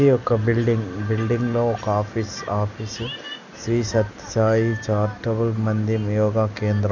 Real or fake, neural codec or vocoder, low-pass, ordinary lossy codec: real; none; 7.2 kHz; none